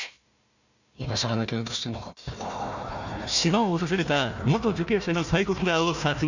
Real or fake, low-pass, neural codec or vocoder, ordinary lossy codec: fake; 7.2 kHz; codec, 16 kHz, 1 kbps, FunCodec, trained on Chinese and English, 50 frames a second; none